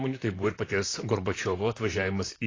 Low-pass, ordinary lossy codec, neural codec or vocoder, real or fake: 7.2 kHz; AAC, 32 kbps; vocoder, 44.1 kHz, 128 mel bands, Pupu-Vocoder; fake